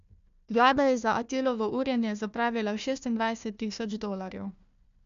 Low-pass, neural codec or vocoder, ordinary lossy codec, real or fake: 7.2 kHz; codec, 16 kHz, 1 kbps, FunCodec, trained on Chinese and English, 50 frames a second; MP3, 64 kbps; fake